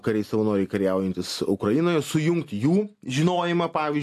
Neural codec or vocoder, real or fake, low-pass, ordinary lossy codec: none; real; 14.4 kHz; AAC, 64 kbps